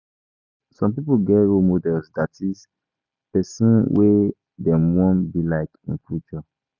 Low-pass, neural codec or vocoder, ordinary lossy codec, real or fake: 7.2 kHz; none; none; real